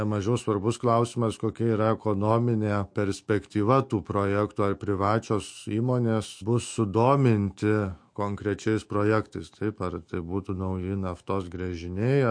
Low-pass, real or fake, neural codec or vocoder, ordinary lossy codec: 9.9 kHz; fake; autoencoder, 48 kHz, 128 numbers a frame, DAC-VAE, trained on Japanese speech; MP3, 48 kbps